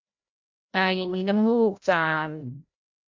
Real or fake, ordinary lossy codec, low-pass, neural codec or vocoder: fake; MP3, 64 kbps; 7.2 kHz; codec, 16 kHz, 0.5 kbps, FreqCodec, larger model